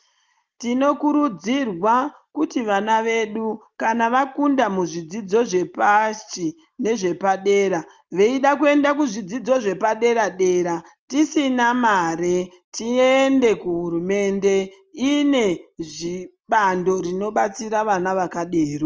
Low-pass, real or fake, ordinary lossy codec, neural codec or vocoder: 7.2 kHz; real; Opus, 24 kbps; none